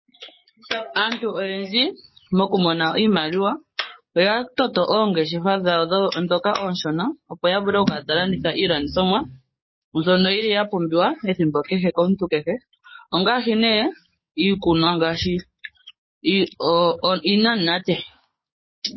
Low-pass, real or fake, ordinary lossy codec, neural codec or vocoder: 7.2 kHz; fake; MP3, 24 kbps; codec, 44.1 kHz, 7.8 kbps, DAC